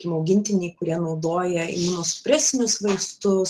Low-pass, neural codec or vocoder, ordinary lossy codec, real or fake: 14.4 kHz; none; Opus, 16 kbps; real